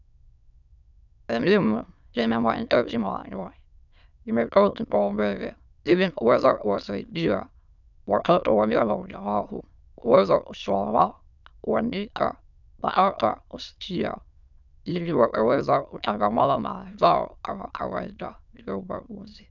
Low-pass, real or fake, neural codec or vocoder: 7.2 kHz; fake; autoencoder, 22.05 kHz, a latent of 192 numbers a frame, VITS, trained on many speakers